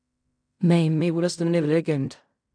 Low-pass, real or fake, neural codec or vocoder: 9.9 kHz; fake; codec, 16 kHz in and 24 kHz out, 0.4 kbps, LongCat-Audio-Codec, fine tuned four codebook decoder